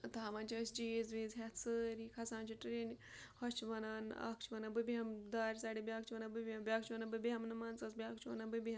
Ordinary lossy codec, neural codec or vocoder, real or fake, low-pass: none; none; real; none